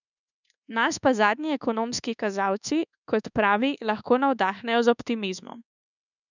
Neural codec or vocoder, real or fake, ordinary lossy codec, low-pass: codec, 24 kHz, 1.2 kbps, DualCodec; fake; none; 7.2 kHz